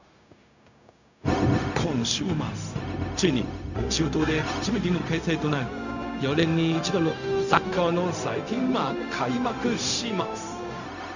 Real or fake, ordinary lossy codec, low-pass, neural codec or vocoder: fake; none; 7.2 kHz; codec, 16 kHz, 0.4 kbps, LongCat-Audio-Codec